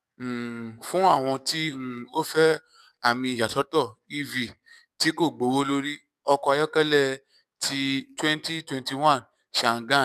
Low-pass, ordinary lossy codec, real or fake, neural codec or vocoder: 14.4 kHz; none; fake; codec, 44.1 kHz, 7.8 kbps, DAC